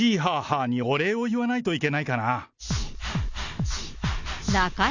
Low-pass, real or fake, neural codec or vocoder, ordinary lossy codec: 7.2 kHz; real; none; none